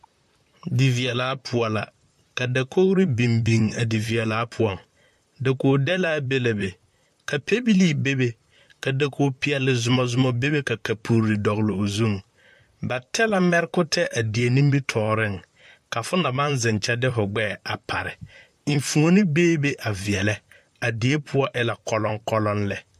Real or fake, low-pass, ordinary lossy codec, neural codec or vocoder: fake; 14.4 kHz; AAC, 96 kbps; vocoder, 44.1 kHz, 128 mel bands, Pupu-Vocoder